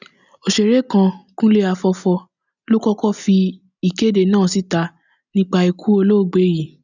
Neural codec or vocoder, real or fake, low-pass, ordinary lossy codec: none; real; 7.2 kHz; none